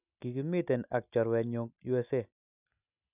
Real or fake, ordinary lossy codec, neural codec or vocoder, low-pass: real; none; none; 3.6 kHz